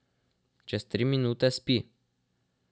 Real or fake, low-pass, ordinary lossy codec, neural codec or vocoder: real; none; none; none